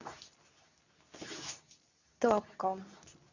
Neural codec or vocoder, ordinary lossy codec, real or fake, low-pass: codec, 24 kHz, 0.9 kbps, WavTokenizer, medium speech release version 2; none; fake; 7.2 kHz